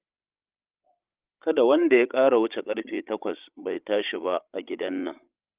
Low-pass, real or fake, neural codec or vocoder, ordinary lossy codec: 3.6 kHz; fake; codec, 16 kHz, 16 kbps, FreqCodec, larger model; Opus, 24 kbps